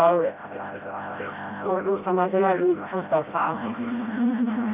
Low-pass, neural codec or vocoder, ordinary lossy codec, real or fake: 3.6 kHz; codec, 16 kHz, 0.5 kbps, FreqCodec, smaller model; none; fake